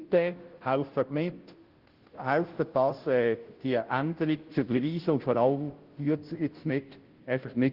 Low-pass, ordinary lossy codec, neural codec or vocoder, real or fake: 5.4 kHz; Opus, 16 kbps; codec, 16 kHz, 0.5 kbps, FunCodec, trained on Chinese and English, 25 frames a second; fake